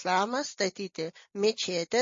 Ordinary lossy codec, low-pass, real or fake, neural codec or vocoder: MP3, 32 kbps; 7.2 kHz; real; none